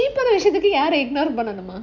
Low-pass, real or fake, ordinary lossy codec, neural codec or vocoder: 7.2 kHz; real; none; none